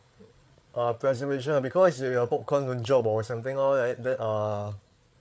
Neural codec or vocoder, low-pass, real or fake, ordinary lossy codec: codec, 16 kHz, 8 kbps, FreqCodec, larger model; none; fake; none